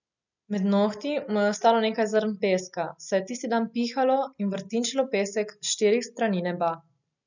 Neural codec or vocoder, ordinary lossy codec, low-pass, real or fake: none; none; 7.2 kHz; real